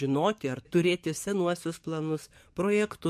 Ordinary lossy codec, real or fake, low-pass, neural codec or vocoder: MP3, 64 kbps; fake; 14.4 kHz; codec, 44.1 kHz, 7.8 kbps, DAC